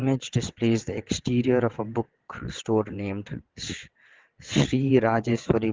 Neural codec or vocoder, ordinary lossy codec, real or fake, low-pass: vocoder, 22.05 kHz, 80 mel bands, WaveNeXt; Opus, 16 kbps; fake; 7.2 kHz